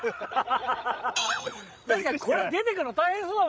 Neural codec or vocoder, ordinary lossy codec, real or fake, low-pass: codec, 16 kHz, 16 kbps, FreqCodec, larger model; none; fake; none